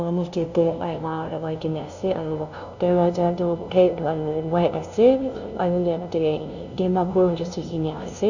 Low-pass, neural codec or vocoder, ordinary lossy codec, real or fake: 7.2 kHz; codec, 16 kHz, 0.5 kbps, FunCodec, trained on LibriTTS, 25 frames a second; none; fake